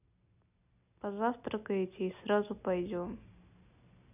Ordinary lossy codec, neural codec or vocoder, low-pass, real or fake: none; none; 3.6 kHz; real